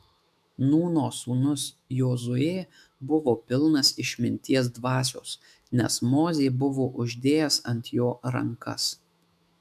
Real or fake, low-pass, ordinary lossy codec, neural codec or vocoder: fake; 14.4 kHz; MP3, 96 kbps; autoencoder, 48 kHz, 128 numbers a frame, DAC-VAE, trained on Japanese speech